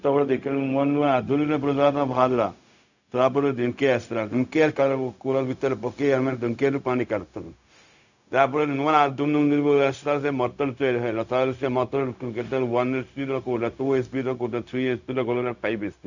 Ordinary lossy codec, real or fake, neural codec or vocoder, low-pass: none; fake; codec, 16 kHz, 0.4 kbps, LongCat-Audio-Codec; 7.2 kHz